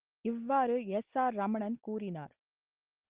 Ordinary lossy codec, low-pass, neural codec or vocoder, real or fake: Opus, 16 kbps; 3.6 kHz; none; real